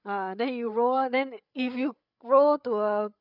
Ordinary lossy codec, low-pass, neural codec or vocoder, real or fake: none; 5.4 kHz; codec, 16 kHz, 8 kbps, FreqCodec, larger model; fake